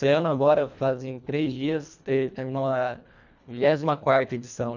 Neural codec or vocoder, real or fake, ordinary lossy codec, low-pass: codec, 24 kHz, 1.5 kbps, HILCodec; fake; none; 7.2 kHz